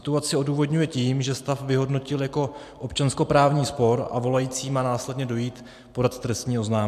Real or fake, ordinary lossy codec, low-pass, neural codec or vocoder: real; AAC, 96 kbps; 14.4 kHz; none